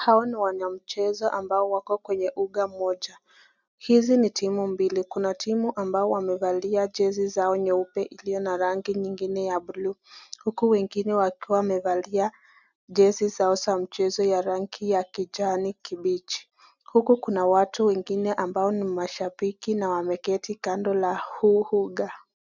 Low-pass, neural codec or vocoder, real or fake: 7.2 kHz; none; real